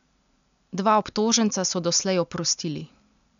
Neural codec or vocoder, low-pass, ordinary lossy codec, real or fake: none; 7.2 kHz; none; real